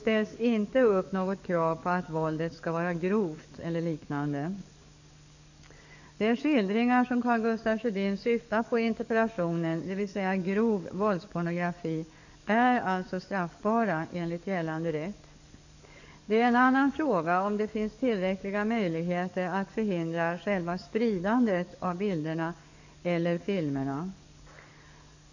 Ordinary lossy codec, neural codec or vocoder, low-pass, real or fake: none; codec, 44.1 kHz, 7.8 kbps, DAC; 7.2 kHz; fake